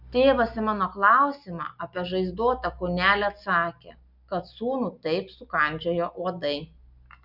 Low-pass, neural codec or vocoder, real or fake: 5.4 kHz; none; real